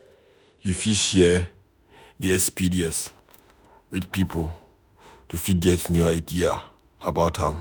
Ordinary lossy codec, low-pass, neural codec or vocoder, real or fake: none; none; autoencoder, 48 kHz, 32 numbers a frame, DAC-VAE, trained on Japanese speech; fake